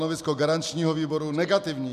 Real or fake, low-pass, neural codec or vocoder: real; 14.4 kHz; none